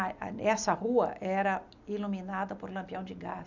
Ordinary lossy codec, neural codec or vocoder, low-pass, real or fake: none; none; 7.2 kHz; real